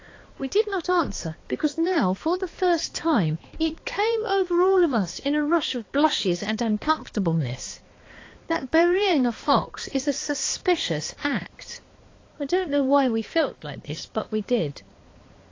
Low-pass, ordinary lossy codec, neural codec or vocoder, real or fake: 7.2 kHz; AAC, 32 kbps; codec, 16 kHz, 2 kbps, X-Codec, HuBERT features, trained on balanced general audio; fake